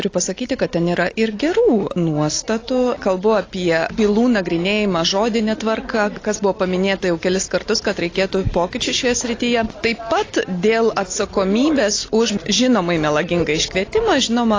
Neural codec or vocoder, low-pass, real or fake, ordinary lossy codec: none; 7.2 kHz; real; AAC, 32 kbps